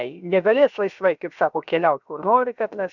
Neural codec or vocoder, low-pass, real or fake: codec, 16 kHz, about 1 kbps, DyCAST, with the encoder's durations; 7.2 kHz; fake